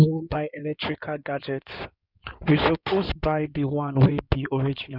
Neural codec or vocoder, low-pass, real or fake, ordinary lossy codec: codec, 16 kHz in and 24 kHz out, 2.2 kbps, FireRedTTS-2 codec; 5.4 kHz; fake; none